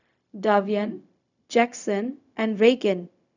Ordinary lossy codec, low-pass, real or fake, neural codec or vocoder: none; 7.2 kHz; fake; codec, 16 kHz, 0.4 kbps, LongCat-Audio-Codec